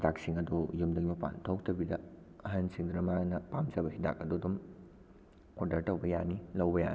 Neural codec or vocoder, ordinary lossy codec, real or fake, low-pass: none; none; real; none